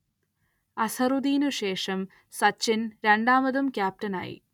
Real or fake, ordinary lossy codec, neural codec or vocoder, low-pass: real; none; none; 19.8 kHz